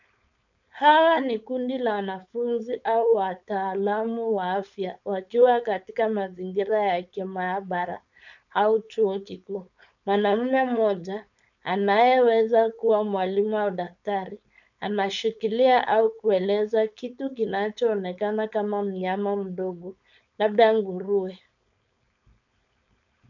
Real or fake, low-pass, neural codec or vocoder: fake; 7.2 kHz; codec, 16 kHz, 4.8 kbps, FACodec